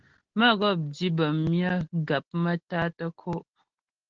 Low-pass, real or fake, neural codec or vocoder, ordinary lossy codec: 7.2 kHz; real; none; Opus, 16 kbps